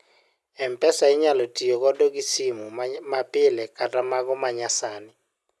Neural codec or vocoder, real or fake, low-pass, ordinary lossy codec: none; real; none; none